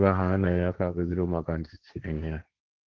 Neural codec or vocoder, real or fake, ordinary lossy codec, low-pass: codec, 16 kHz, 1.1 kbps, Voila-Tokenizer; fake; Opus, 16 kbps; 7.2 kHz